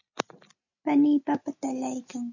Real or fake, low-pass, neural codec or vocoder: real; 7.2 kHz; none